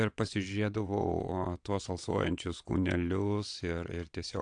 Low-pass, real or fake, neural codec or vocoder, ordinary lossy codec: 9.9 kHz; fake; vocoder, 22.05 kHz, 80 mel bands, WaveNeXt; AAC, 64 kbps